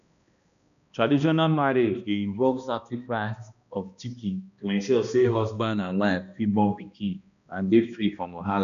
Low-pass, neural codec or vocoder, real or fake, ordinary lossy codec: 7.2 kHz; codec, 16 kHz, 1 kbps, X-Codec, HuBERT features, trained on balanced general audio; fake; MP3, 96 kbps